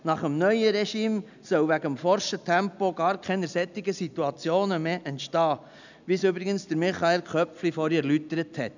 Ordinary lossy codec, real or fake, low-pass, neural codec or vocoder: none; real; 7.2 kHz; none